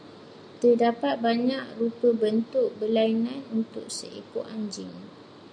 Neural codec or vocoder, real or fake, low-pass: none; real; 9.9 kHz